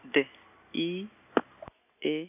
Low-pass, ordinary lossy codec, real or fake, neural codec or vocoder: 3.6 kHz; none; real; none